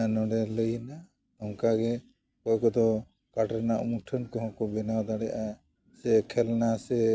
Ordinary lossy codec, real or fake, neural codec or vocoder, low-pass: none; real; none; none